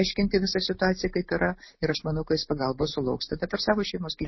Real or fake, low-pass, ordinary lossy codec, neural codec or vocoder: real; 7.2 kHz; MP3, 24 kbps; none